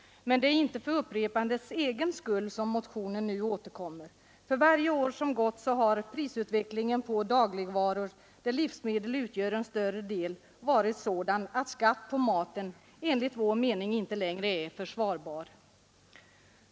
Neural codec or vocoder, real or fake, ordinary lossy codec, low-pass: none; real; none; none